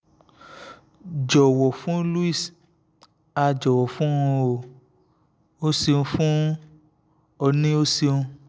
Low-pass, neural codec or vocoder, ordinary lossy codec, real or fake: none; none; none; real